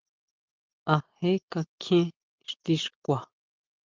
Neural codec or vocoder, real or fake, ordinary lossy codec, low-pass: none; real; Opus, 32 kbps; 7.2 kHz